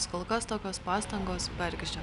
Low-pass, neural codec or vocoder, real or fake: 10.8 kHz; none; real